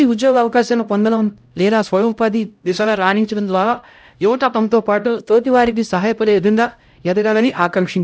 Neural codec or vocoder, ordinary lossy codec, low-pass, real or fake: codec, 16 kHz, 0.5 kbps, X-Codec, HuBERT features, trained on LibriSpeech; none; none; fake